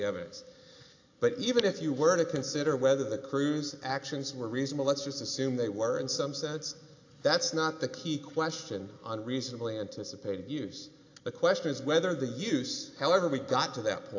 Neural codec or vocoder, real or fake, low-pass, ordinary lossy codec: none; real; 7.2 kHz; AAC, 48 kbps